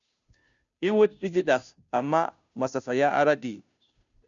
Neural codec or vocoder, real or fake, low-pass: codec, 16 kHz, 0.5 kbps, FunCodec, trained on Chinese and English, 25 frames a second; fake; 7.2 kHz